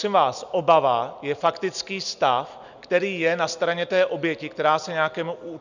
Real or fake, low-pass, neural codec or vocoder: real; 7.2 kHz; none